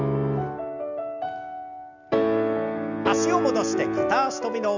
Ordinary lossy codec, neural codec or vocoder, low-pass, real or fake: none; none; 7.2 kHz; real